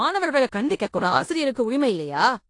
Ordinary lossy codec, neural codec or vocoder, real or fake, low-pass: AAC, 48 kbps; codec, 16 kHz in and 24 kHz out, 0.9 kbps, LongCat-Audio-Codec, four codebook decoder; fake; 10.8 kHz